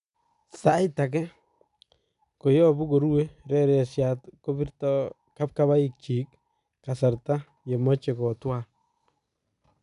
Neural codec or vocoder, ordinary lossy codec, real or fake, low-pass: none; none; real; 10.8 kHz